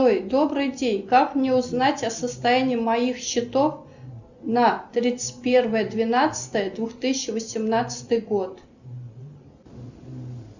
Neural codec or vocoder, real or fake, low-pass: none; real; 7.2 kHz